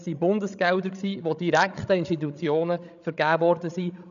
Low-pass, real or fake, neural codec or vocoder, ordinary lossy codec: 7.2 kHz; fake; codec, 16 kHz, 16 kbps, FreqCodec, larger model; none